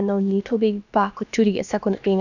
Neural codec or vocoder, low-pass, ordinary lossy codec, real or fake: codec, 16 kHz, about 1 kbps, DyCAST, with the encoder's durations; 7.2 kHz; none; fake